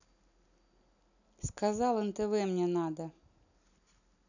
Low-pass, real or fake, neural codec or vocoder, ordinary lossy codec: 7.2 kHz; real; none; none